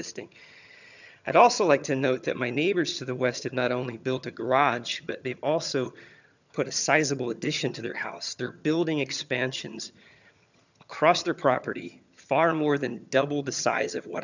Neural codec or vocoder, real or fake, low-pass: vocoder, 22.05 kHz, 80 mel bands, HiFi-GAN; fake; 7.2 kHz